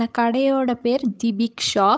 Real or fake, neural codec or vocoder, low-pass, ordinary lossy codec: fake; codec, 16 kHz, 8 kbps, FunCodec, trained on Chinese and English, 25 frames a second; none; none